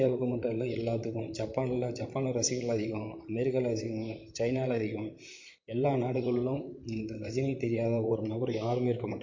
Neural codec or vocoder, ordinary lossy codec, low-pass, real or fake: vocoder, 44.1 kHz, 128 mel bands, Pupu-Vocoder; MP3, 48 kbps; 7.2 kHz; fake